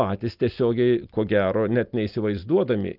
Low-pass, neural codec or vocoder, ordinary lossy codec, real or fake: 5.4 kHz; none; Opus, 24 kbps; real